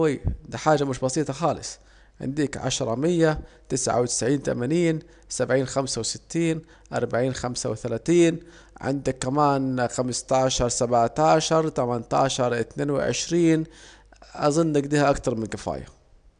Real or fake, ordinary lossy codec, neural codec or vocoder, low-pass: real; none; none; 9.9 kHz